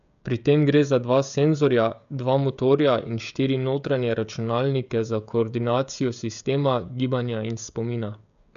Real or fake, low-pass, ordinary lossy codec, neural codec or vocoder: fake; 7.2 kHz; none; codec, 16 kHz, 16 kbps, FreqCodec, smaller model